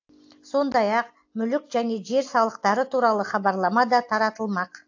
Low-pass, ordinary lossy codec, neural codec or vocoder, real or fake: 7.2 kHz; AAC, 48 kbps; none; real